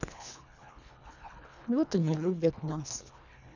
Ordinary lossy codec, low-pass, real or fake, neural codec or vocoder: none; 7.2 kHz; fake; codec, 24 kHz, 1.5 kbps, HILCodec